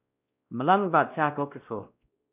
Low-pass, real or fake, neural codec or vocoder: 3.6 kHz; fake; codec, 16 kHz, 1 kbps, X-Codec, WavLM features, trained on Multilingual LibriSpeech